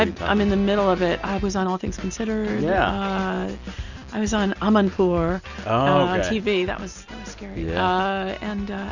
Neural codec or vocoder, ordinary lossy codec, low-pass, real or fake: none; Opus, 64 kbps; 7.2 kHz; real